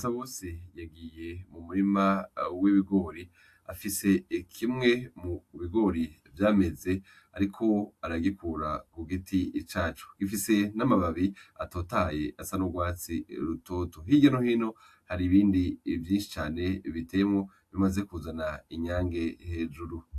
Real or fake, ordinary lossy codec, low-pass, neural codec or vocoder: real; AAC, 64 kbps; 14.4 kHz; none